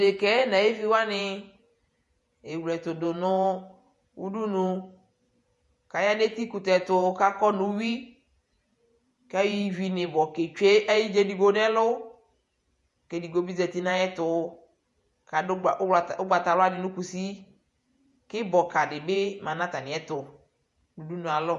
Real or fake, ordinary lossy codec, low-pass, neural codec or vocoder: fake; MP3, 48 kbps; 14.4 kHz; vocoder, 48 kHz, 128 mel bands, Vocos